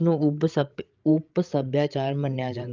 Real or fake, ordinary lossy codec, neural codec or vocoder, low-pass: fake; Opus, 24 kbps; codec, 16 kHz, 8 kbps, FreqCodec, larger model; 7.2 kHz